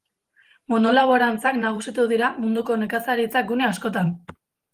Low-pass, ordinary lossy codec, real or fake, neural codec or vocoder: 14.4 kHz; Opus, 24 kbps; fake; vocoder, 44.1 kHz, 128 mel bands every 512 samples, BigVGAN v2